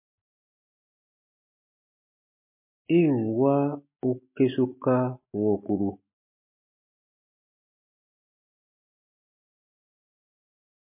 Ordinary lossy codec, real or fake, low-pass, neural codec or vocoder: MP3, 24 kbps; real; 3.6 kHz; none